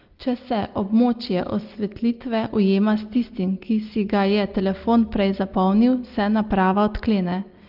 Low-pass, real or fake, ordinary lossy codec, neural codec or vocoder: 5.4 kHz; real; Opus, 32 kbps; none